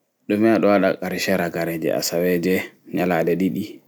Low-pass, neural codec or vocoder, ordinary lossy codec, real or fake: none; none; none; real